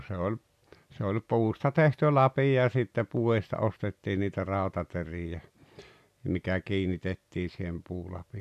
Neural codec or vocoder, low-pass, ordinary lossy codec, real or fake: none; 14.4 kHz; none; real